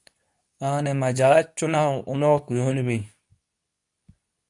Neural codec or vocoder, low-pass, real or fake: codec, 24 kHz, 0.9 kbps, WavTokenizer, medium speech release version 2; 10.8 kHz; fake